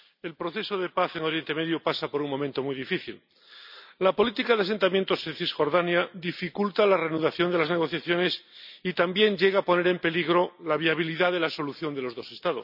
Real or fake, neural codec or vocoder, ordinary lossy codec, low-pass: real; none; none; 5.4 kHz